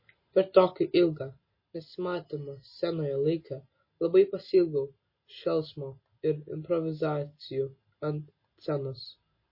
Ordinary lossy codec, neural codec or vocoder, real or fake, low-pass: MP3, 24 kbps; none; real; 5.4 kHz